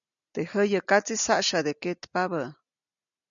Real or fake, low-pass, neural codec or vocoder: real; 7.2 kHz; none